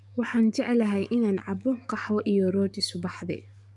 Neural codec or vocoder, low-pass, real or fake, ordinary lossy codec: codec, 44.1 kHz, 7.8 kbps, DAC; 10.8 kHz; fake; none